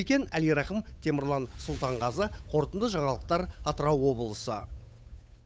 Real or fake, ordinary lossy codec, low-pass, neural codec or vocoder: fake; none; none; codec, 16 kHz, 8 kbps, FunCodec, trained on Chinese and English, 25 frames a second